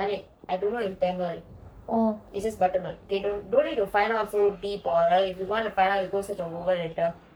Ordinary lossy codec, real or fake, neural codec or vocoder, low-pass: none; fake; codec, 44.1 kHz, 3.4 kbps, Pupu-Codec; none